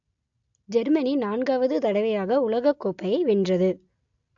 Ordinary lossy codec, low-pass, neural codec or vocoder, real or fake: AAC, 64 kbps; 7.2 kHz; none; real